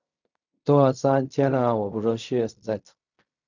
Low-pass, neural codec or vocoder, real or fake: 7.2 kHz; codec, 16 kHz in and 24 kHz out, 0.4 kbps, LongCat-Audio-Codec, fine tuned four codebook decoder; fake